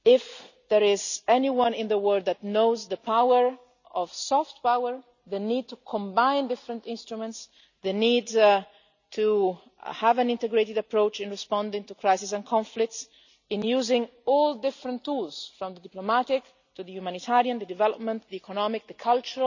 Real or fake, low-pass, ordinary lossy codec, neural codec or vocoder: real; 7.2 kHz; none; none